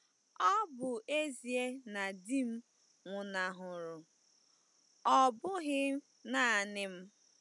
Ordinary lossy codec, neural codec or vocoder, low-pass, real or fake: none; none; 10.8 kHz; real